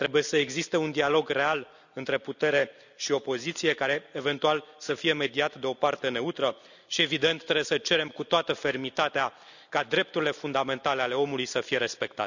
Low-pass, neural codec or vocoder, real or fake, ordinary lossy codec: 7.2 kHz; none; real; none